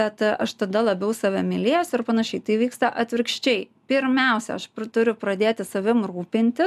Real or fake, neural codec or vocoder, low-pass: real; none; 14.4 kHz